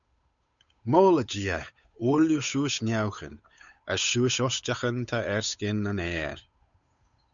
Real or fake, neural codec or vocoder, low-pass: fake; codec, 16 kHz, 8 kbps, FunCodec, trained on Chinese and English, 25 frames a second; 7.2 kHz